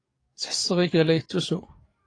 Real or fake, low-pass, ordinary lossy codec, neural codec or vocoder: fake; 9.9 kHz; AAC, 32 kbps; codec, 44.1 kHz, 7.8 kbps, DAC